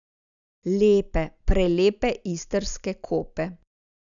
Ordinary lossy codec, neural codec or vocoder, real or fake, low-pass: none; none; real; 7.2 kHz